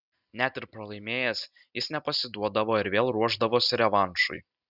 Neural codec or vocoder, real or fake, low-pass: none; real; 5.4 kHz